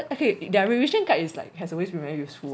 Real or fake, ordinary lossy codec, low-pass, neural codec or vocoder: real; none; none; none